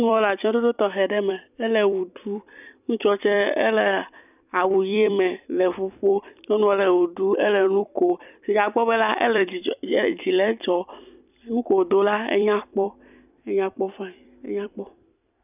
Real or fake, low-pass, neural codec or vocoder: fake; 3.6 kHz; vocoder, 44.1 kHz, 128 mel bands every 256 samples, BigVGAN v2